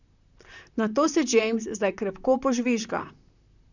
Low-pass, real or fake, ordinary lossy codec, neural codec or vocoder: 7.2 kHz; fake; none; vocoder, 44.1 kHz, 128 mel bands, Pupu-Vocoder